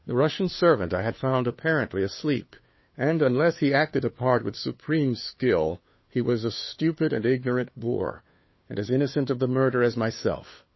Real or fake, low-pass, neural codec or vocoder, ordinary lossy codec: fake; 7.2 kHz; codec, 16 kHz, 2 kbps, FunCodec, trained on Chinese and English, 25 frames a second; MP3, 24 kbps